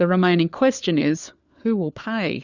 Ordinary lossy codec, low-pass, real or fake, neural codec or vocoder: Opus, 64 kbps; 7.2 kHz; fake; codec, 16 kHz, 4 kbps, FunCodec, trained on LibriTTS, 50 frames a second